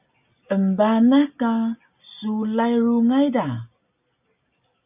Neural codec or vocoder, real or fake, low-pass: none; real; 3.6 kHz